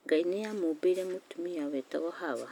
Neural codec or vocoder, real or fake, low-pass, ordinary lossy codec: none; real; 19.8 kHz; none